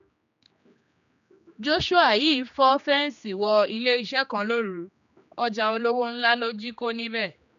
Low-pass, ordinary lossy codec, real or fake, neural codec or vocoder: 7.2 kHz; none; fake; codec, 16 kHz, 2 kbps, X-Codec, HuBERT features, trained on general audio